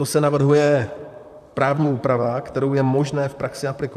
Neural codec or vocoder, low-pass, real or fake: vocoder, 44.1 kHz, 128 mel bands, Pupu-Vocoder; 14.4 kHz; fake